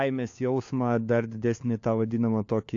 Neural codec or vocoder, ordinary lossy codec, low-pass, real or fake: codec, 16 kHz, 4 kbps, FunCodec, trained on LibriTTS, 50 frames a second; AAC, 48 kbps; 7.2 kHz; fake